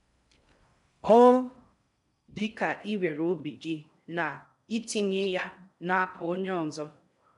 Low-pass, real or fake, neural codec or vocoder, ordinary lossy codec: 10.8 kHz; fake; codec, 16 kHz in and 24 kHz out, 0.6 kbps, FocalCodec, streaming, 4096 codes; MP3, 96 kbps